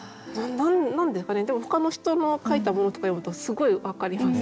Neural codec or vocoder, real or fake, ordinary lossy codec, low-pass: none; real; none; none